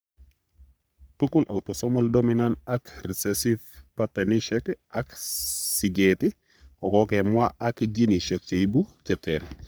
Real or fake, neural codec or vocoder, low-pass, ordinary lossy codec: fake; codec, 44.1 kHz, 3.4 kbps, Pupu-Codec; none; none